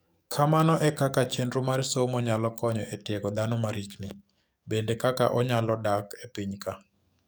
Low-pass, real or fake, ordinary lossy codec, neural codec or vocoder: none; fake; none; codec, 44.1 kHz, 7.8 kbps, DAC